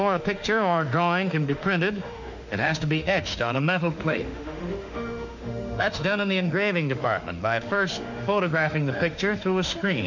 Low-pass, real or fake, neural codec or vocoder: 7.2 kHz; fake; autoencoder, 48 kHz, 32 numbers a frame, DAC-VAE, trained on Japanese speech